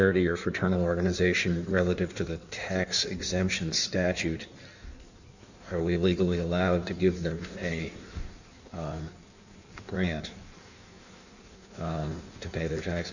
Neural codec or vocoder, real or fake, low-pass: codec, 16 kHz in and 24 kHz out, 1.1 kbps, FireRedTTS-2 codec; fake; 7.2 kHz